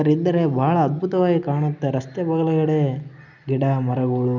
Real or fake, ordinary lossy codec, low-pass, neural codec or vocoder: real; none; 7.2 kHz; none